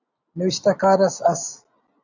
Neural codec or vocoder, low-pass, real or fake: none; 7.2 kHz; real